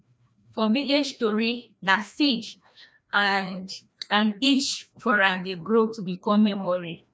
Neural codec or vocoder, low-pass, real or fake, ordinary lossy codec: codec, 16 kHz, 1 kbps, FreqCodec, larger model; none; fake; none